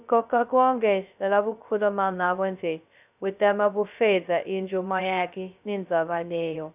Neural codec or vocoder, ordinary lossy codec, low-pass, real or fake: codec, 16 kHz, 0.2 kbps, FocalCodec; none; 3.6 kHz; fake